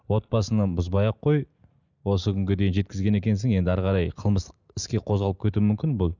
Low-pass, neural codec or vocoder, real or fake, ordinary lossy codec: 7.2 kHz; none; real; none